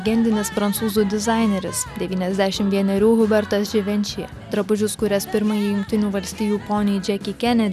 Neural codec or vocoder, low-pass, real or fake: none; 14.4 kHz; real